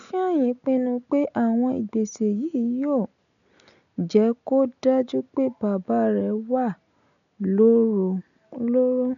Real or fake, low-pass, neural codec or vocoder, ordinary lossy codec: real; 7.2 kHz; none; none